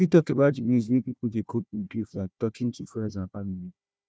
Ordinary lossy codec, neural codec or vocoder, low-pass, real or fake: none; codec, 16 kHz, 1 kbps, FunCodec, trained on Chinese and English, 50 frames a second; none; fake